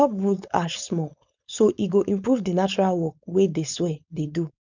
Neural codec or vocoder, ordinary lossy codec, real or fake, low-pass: codec, 16 kHz, 4.8 kbps, FACodec; none; fake; 7.2 kHz